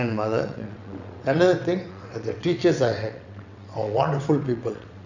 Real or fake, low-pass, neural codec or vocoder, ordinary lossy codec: fake; 7.2 kHz; vocoder, 22.05 kHz, 80 mel bands, WaveNeXt; none